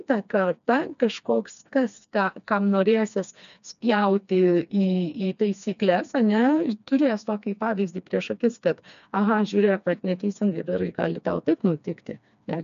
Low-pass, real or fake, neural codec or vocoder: 7.2 kHz; fake; codec, 16 kHz, 2 kbps, FreqCodec, smaller model